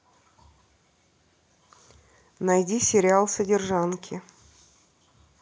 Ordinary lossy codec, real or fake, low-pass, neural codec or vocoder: none; real; none; none